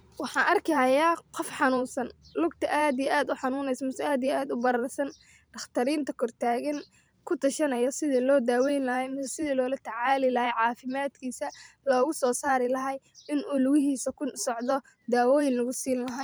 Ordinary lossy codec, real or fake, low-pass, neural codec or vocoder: none; fake; none; vocoder, 44.1 kHz, 128 mel bands every 512 samples, BigVGAN v2